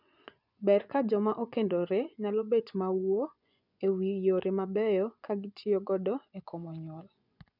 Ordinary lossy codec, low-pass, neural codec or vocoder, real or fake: none; 5.4 kHz; vocoder, 24 kHz, 100 mel bands, Vocos; fake